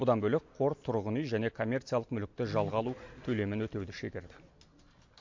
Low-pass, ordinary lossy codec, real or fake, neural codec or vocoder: 7.2 kHz; MP3, 48 kbps; real; none